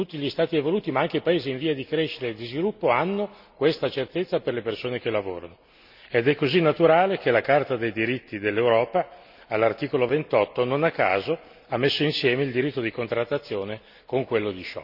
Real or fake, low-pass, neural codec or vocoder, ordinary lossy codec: real; 5.4 kHz; none; none